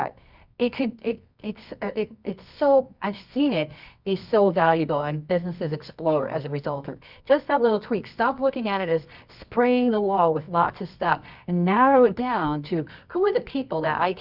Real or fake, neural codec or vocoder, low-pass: fake; codec, 24 kHz, 0.9 kbps, WavTokenizer, medium music audio release; 5.4 kHz